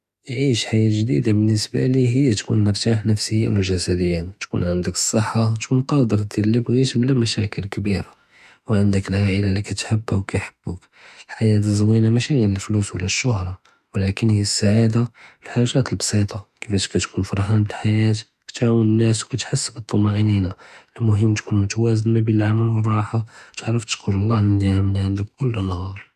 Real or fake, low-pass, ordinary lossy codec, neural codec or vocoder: fake; 14.4 kHz; none; autoencoder, 48 kHz, 32 numbers a frame, DAC-VAE, trained on Japanese speech